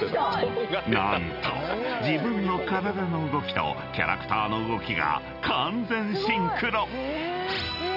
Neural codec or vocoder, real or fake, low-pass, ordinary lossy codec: none; real; 5.4 kHz; none